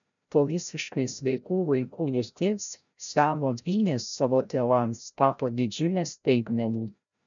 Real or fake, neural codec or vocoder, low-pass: fake; codec, 16 kHz, 0.5 kbps, FreqCodec, larger model; 7.2 kHz